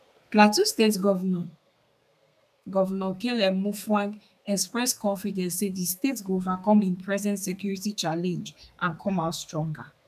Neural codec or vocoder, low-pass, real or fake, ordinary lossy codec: codec, 32 kHz, 1.9 kbps, SNAC; 14.4 kHz; fake; none